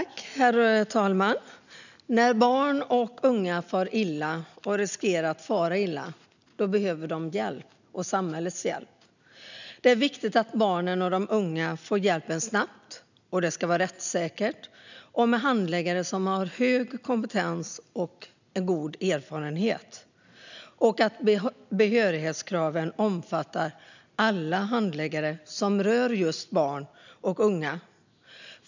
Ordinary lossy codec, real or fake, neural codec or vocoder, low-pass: none; real; none; 7.2 kHz